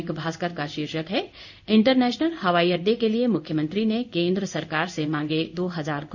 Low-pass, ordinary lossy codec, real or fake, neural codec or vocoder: 7.2 kHz; none; fake; codec, 16 kHz in and 24 kHz out, 1 kbps, XY-Tokenizer